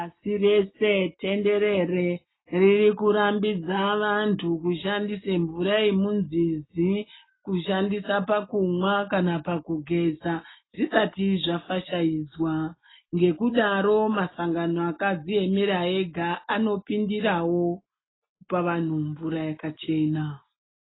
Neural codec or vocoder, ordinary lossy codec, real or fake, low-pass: none; AAC, 16 kbps; real; 7.2 kHz